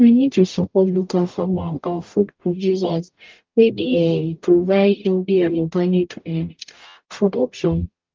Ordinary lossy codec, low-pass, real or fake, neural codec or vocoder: Opus, 24 kbps; 7.2 kHz; fake; codec, 44.1 kHz, 0.9 kbps, DAC